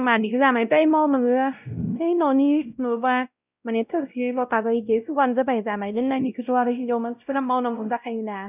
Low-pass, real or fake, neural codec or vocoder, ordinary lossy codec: 3.6 kHz; fake; codec, 16 kHz, 0.5 kbps, X-Codec, WavLM features, trained on Multilingual LibriSpeech; none